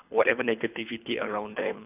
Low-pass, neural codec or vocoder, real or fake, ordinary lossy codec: 3.6 kHz; codec, 24 kHz, 3 kbps, HILCodec; fake; none